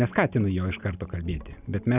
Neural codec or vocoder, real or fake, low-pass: none; real; 3.6 kHz